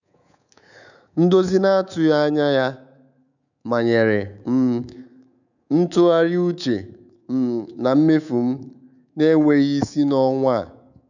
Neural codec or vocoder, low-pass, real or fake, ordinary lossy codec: codec, 24 kHz, 3.1 kbps, DualCodec; 7.2 kHz; fake; none